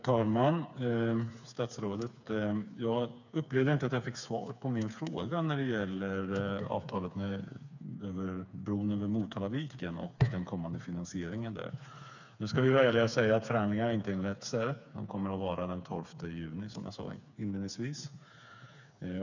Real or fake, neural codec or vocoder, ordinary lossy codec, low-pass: fake; codec, 16 kHz, 4 kbps, FreqCodec, smaller model; none; 7.2 kHz